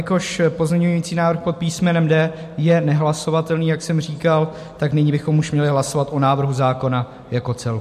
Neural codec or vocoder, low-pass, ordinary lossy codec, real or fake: none; 14.4 kHz; MP3, 64 kbps; real